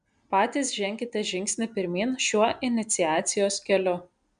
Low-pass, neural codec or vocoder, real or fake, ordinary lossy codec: 9.9 kHz; none; real; AAC, 96 kbps